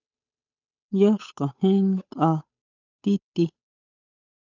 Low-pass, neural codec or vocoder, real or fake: 7.2 kHz; codec, 16 kHz, 8 kbps, FunCodec, trained on Chinese and English, 25 frames a second; fake